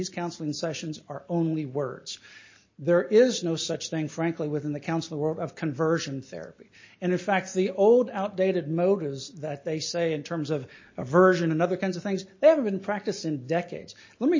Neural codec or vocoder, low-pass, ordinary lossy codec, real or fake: none; 7.2 kHz; MP3, 32 kbps; real